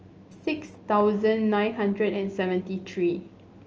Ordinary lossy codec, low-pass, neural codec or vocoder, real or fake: Opus, 24 kbps; 7.2 kHz; none; real